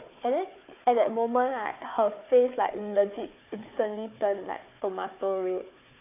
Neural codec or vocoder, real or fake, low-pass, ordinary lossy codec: codec, 16 kHz, 4 kbps, FunCodec, trained on Chinese and English, 50 frames a second; fake; 3.6 kHz; AAC, 24 kbps